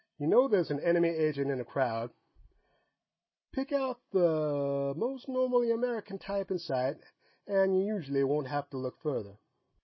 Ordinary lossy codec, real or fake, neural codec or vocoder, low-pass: MP3, 24 kbps; real; none; 7.2 kHz